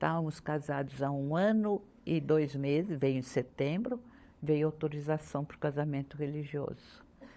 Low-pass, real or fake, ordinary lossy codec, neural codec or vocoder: none; fake; none; codec, 16 kHz, 16 kbps, FunCodec, trained on LibriTTS, 50 frames a second